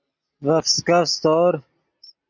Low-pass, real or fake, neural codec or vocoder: 7.2 kHz; real; none